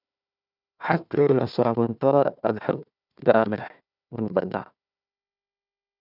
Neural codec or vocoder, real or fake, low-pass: codec, 16 kHz, 1 kbps, FunCodec, trained on Chinese and English, 50 frames a second; fake; 5.4 kHz